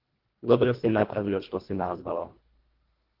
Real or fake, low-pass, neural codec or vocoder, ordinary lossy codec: fake; 5.4 kHz; codec, 24 kHz, 1.5 kbps, HILCodec; Opus, 16 kbps